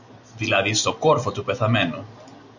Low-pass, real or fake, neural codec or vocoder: 7.2 kHz; real; none